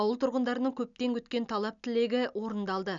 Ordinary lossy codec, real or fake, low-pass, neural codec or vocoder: none; real; 7.2 kHz; none